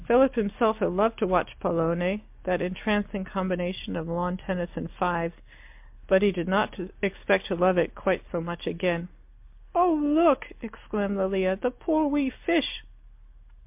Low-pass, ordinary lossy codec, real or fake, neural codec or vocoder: 3.6 kHz; MP3, 32 kbps; real; none